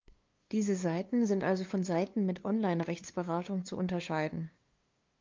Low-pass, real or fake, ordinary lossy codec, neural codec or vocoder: 7.2 kHz; fake; Opus, 24 kbps; codec, 16 kHz, 2 kbps, FunCodec, trained on LibriTTS, 25 frames a second